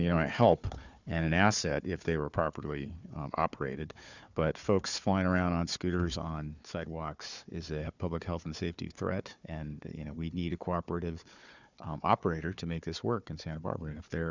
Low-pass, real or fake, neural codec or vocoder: 7.2 kHz; fake; codec, 16 kHz, 4 kbps, FunCodec, trained on Chinese and English, 50 frames a second